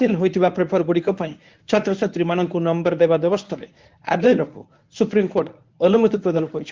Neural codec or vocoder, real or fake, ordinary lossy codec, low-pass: codec, 24 kHz, 0.9 kbps, WavTokenizer, medium speech release version 1; fake; Opus, 32 kbps; 7.2 kHz